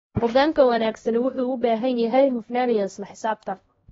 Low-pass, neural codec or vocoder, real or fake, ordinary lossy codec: 7.2 kHz; codec, 16 kHz, 1 kbps, X-Codec, HuBERT features, trained on LibriSpeech; fake; AAC, 24 kbps